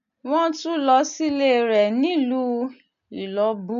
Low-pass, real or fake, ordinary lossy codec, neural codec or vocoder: 7.2 kHz; real; none; none